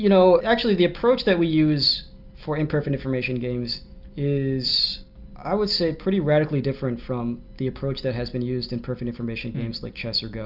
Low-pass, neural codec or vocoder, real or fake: 5.4 kHz; none; real